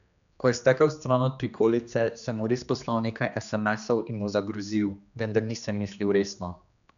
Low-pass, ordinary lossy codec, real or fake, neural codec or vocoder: 7.2 kHz; none; fake; codec, 16 kHz, 2 kbps, X-Codec, HuBERT features, trained on general audio